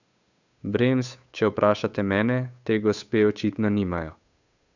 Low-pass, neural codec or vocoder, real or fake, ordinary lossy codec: 7.2 kHz; codec, 16 kHz, 8 kbps, FunCodec, trained on Chinese and English, 25 frames a second; fake; none